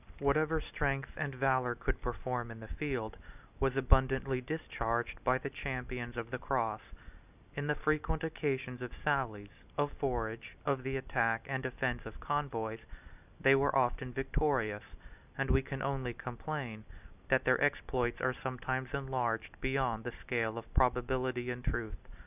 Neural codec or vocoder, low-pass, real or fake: none; 3.6 kHz; real